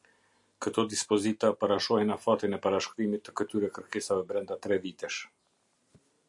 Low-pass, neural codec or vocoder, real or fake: 10.8 kHz; none; real